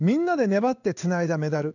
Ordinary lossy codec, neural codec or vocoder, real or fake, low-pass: none; codec, 16 kHz in and 24 kHz out, 1 kbps, XY-Tokenizer; fake; 7.2 kHz